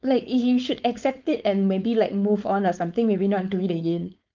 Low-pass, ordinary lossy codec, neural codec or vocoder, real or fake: 7.2 kHz; Opus, 24 kbps; codec, 16 kHz, 4.8 kbps, FACodec; fake